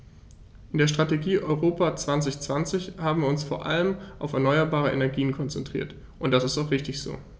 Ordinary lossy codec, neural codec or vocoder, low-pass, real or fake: none; none; none; real